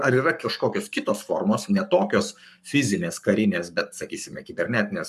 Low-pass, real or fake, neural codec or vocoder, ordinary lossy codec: 14.4 kHz; fake; codec, 44.1 kHz, 7.8 kbps, Pupu-Codec; MP3, 96 kbps